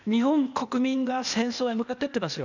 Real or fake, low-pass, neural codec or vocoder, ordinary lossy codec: fake; 7.2 kHz; codec, 16 kHz, 0.8 kbps, ZipCodec; none